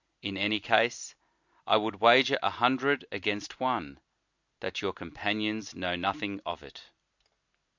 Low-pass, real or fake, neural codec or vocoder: 7.2 kHz; real; none